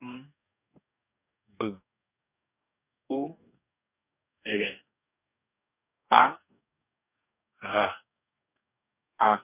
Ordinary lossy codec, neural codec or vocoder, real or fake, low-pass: none; codec, 44.1 kHz, 2.6 kbps, DAC; fake; 3.6 kHz